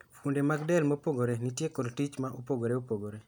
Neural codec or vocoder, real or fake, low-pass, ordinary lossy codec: none; real; none; none